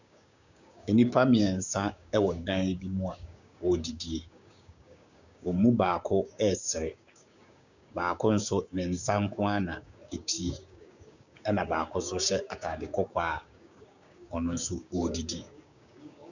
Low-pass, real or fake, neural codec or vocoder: 7.2 kHz; fake; codec, 16 kHz, 6 kbps, DAC